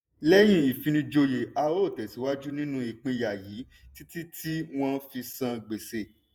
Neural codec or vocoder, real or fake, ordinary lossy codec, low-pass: vocoder, 48 kHz, 128 mel bands, Vocos; fake; none; none